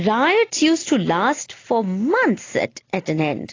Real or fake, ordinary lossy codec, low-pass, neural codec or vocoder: real; AAC, 32 kbps; 7.2 kHz; none